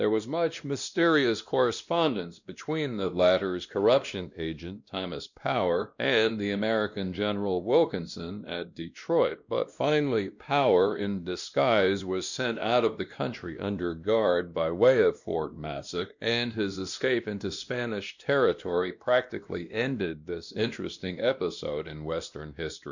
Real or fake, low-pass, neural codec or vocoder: fake; 7.2 kHz; codec, 16 kHz, 1 kbps, X-Codec, WavLM features, trained on Multilingual LibriSpeech